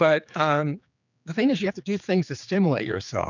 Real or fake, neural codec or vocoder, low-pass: fake; codec, 16 kHz, 4 kbps, X-Codec, HuBERT features, trained on general audio; 7.2 kHz